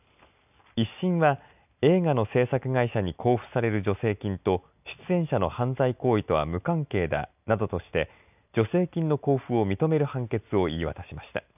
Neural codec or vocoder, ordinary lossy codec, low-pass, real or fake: none; none; 3.6 kHz; real